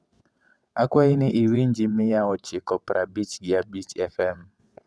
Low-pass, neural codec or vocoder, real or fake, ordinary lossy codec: none; vocoder, 22.05 kHz, 80 mel bands, WaveNeXt; fake; none